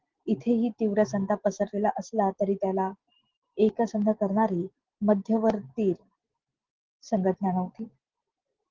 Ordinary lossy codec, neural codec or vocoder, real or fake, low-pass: Opus, 16 kbps; none; real; 7.2 kHz